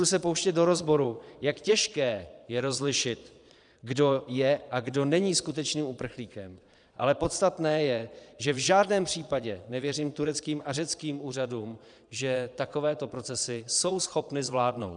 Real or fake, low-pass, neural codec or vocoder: fake; 9.9 kHz; vocoder, 22.05 kHz, 80 mel bands, Vocos